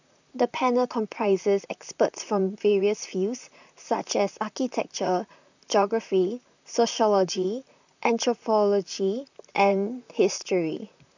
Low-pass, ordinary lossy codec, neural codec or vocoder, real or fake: 7.2 kHz; none; vocoder, 44.1 kHz, 128 mel bands, Pupu-Vocoder; fake